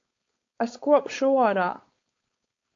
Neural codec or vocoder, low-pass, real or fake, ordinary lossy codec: codec, 16 kHz, 4.8 kbps, FACodec; 7.2 kHz; fake; AAC, 48 kbps